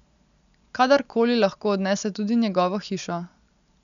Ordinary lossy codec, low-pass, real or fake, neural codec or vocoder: none; 7.2 kHz; real; none